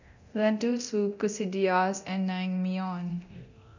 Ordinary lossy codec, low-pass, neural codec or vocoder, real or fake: none; 7.2 kHz; codec, 24 kHz, 0.9 kbps, DualCodec; fake